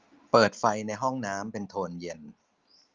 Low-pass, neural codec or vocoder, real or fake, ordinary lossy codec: 7.2 kHz; none; real; Opus, 24 kbps